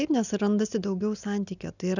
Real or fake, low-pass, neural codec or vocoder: real; 7.2 kHz; none